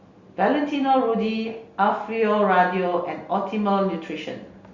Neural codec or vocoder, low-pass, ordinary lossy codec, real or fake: none; 7.2 kHz; Opus, 64 kbps; real